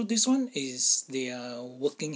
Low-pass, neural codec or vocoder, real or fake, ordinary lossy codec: none; none; real; none